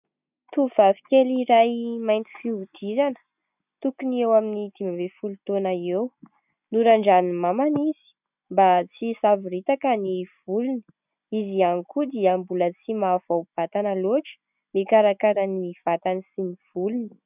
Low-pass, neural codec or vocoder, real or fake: 3.6 kHz; none; real